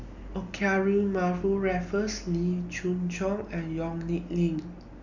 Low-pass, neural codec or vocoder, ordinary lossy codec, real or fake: 7.2 kHz; none; none; real